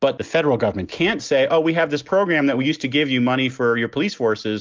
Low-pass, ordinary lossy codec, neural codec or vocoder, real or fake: 7.2 kHz; Opus, 32 kbps; none; real